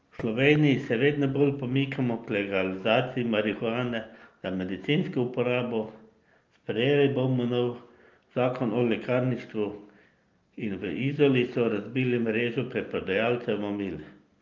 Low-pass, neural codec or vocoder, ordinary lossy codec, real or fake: 7.2 kHz; none; Opus, 24 kbps; real